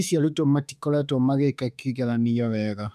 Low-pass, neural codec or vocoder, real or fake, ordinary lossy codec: 14.4 kHz; autoencoder, 48 kHz, 32 numbers a frame, DAC-VAE, trained on Japanese speech; fake; none